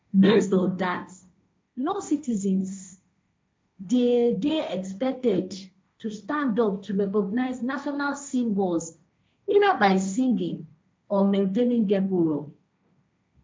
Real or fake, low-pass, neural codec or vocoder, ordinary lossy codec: fake; none; codec, 16 kHz, 1.1 kbps, Voila-Tokenizer; none